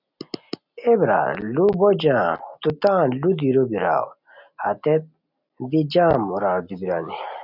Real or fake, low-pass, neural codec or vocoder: real; 5.4 kHz; none